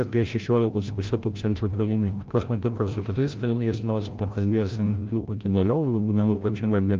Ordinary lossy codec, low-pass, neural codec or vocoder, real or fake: Opus, 32 kbps; 7.2 kHz; codec, 16 kHz, 0.5 kbps, FreqCodec, larger model; fake